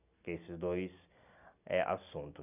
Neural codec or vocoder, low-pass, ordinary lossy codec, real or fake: none; 3.6 kHz; none; real